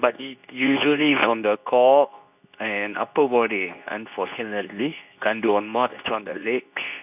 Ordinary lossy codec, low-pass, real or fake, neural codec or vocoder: none; 3.6 kHz; fake; codec, 24 kHz, 0.9 kbps, WavTokenizer, medium speech release version 2